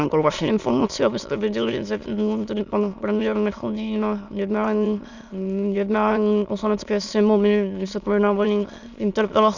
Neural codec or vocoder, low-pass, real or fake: autoencoder, 22.05 kHz, a latent of 192 numbers a frame, VITS, trained on many speakers; 7.2 kHz; fake